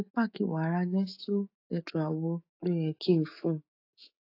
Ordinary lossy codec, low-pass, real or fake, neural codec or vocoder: AAC, 32 kbps; 5.4 kHz; fake; autoencoder, 48 kHz, 128 numbers a frame, DAC-VAE, trained on Japanese speech